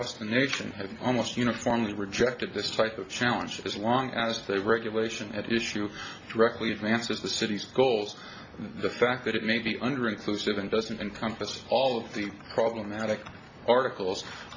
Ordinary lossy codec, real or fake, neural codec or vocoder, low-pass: MP3, 32 kbps; real; none; 7.2 kHz